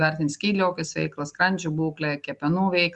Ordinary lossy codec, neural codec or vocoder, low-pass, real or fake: Opus, 64 kbps; none; 10.8 kHz; real